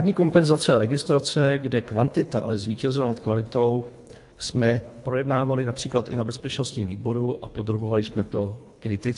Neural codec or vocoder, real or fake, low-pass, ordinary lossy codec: codec, 24 kHz, 1.5 kbps, HILCodec; fake; 10.8 kHz; AAC, 64 kbps